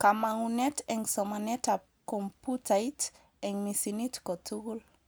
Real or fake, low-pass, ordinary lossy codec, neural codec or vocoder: real; none; none; none